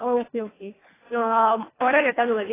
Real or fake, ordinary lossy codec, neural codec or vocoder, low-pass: fake; AAC, 16 kbps; codec, 24 kHz, 1.5 kbps, HILCodec; 3.6 kHz